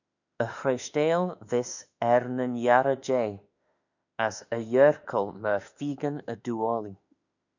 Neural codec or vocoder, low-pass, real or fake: autoencoder, 48 kHz, 32 numbers a frame, DAC-VAE, trained on Japanese speech; 7.2 kHz; fake